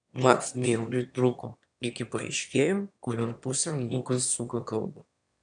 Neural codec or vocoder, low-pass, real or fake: autoencoder, 22.05 kHz, a latent of 192 numbers a frame, VITS, trained on one speaker; 9.9 kHz; fake